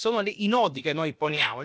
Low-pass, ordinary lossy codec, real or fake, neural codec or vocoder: none; none; fake; codec, 16 kHz, about 1 kbps, DyCAST, with the encoder's durations